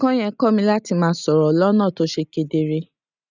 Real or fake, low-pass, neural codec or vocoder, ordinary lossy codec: real; 7.2 kHz; none; none